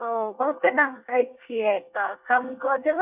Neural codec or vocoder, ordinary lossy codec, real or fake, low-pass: codec, 24 kHz, 1 kbps, SNAC; none; fake; 3.6 kHz